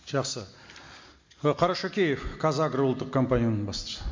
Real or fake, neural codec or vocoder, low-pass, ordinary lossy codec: real; none; 7.2 kHz; MP3, 48 kbps